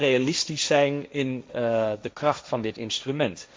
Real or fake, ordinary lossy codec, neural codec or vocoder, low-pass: fake; none; codec, 16 kHz, 1.1 kbps, Voila-Tokenizer; none